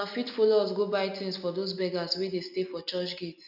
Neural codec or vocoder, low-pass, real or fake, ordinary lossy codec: none; 5.4 kHz; real; none